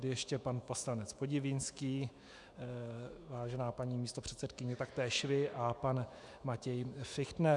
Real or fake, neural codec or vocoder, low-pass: fake; autoencoder, 48 kHz, 128 numbers a frame, DAC-VAE, trained on Japanese speech; 10.8 kHz